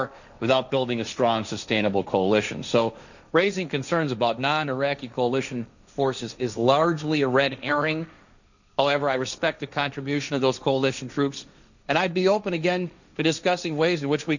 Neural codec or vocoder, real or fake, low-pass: codec, 16 kHz, 1.1 kbps, Voila-Tokenizer; fake; 7.2 kHz